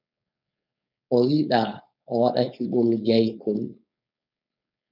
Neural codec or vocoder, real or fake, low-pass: codec, 16 kHz, 4.8 kbps, FACodec; fake; 5.4 kHz